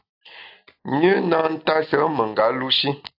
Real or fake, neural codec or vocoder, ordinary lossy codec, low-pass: real; none; AAC, 48 kbps; 5.4 kHz